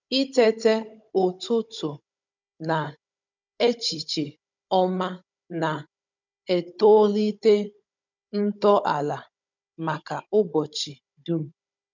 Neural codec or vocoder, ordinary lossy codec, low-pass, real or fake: codec, 16 kHz, 16 kbps, FunCodec, trained on Chinese and English, 50 frames a second; none; 7.2 kHz; fake